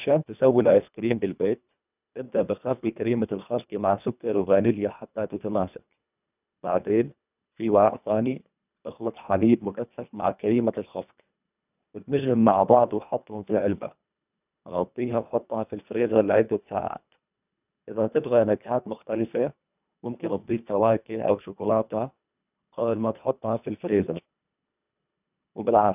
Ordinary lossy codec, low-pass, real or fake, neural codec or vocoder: none; 3.6 kHz; fake; codec, 24 kHz, 1.5 kbps, HILCodec